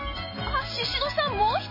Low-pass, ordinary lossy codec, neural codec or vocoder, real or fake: 5.4 kHz; MP3, 24 kbps; none; real